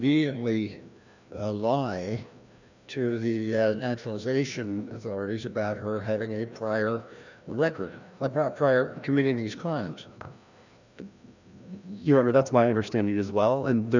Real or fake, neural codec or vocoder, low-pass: fake; codec, 16 kHz, 1 kbps, FreqCodec, larger model; 7.2 kHz